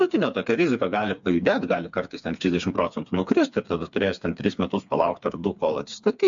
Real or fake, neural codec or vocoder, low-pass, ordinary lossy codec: fake; codec, 16 kHz, 4 kbps, FreqCodec, smaller model; 7.2 kHz; MP3, 64 kbps